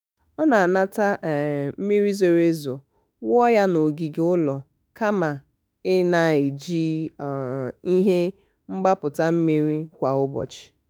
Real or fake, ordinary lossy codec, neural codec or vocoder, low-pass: fake; none; autoencoder, 48 kHz, 32 numbers a frame, DAC-VAE, trained on Japanese speech; none